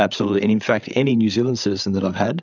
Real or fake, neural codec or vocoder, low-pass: fake; codec, 16 kHz, 8 kbps, FreqCodec, larger model; 7.2 kHz